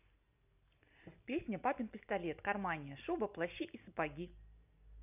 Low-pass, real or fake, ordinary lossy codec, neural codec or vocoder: 3.6 kHz; real; none; none